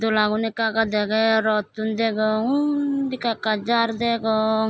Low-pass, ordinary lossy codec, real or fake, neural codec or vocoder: none; none; real; none